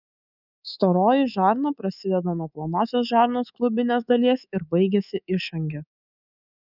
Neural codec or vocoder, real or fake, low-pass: codec, 24 kHz, 3.1 kbps, DualCodec; fake; 5.4 kHz